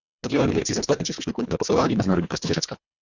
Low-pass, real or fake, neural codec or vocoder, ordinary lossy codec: 7.2 kHz; fake; codec, 24 kHz, 1.5 kbps, HILCodec; Opus, 64 kbps